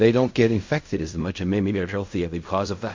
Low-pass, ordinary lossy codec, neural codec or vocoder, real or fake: 7.2 kHz; MP3, 48 kbps; codec, 16 kHz in and 24 kHz out, 0.4 kbps, LongCat-Audio-Codec, fine tuned four codebook decoder; fake